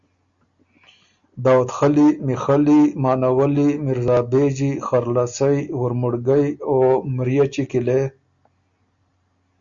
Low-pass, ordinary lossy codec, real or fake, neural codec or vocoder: 7.2 kHz; Opus, 64 kbps; real; none